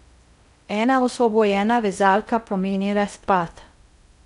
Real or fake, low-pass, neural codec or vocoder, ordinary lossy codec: fake; 10.8 kHz; codec, 16 kHz in and 24 kHz out, 0.6 kbps, FocalCodec, streaming, 2048 codes; none